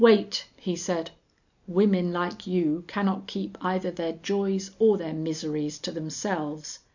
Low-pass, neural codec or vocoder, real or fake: 7.2 kHz; none; real